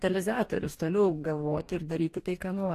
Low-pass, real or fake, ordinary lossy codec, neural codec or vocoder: 14.4 kHz; fake; AAC, 64 kbps; codec, 44.1 kHz, 2.6 kbps, DAC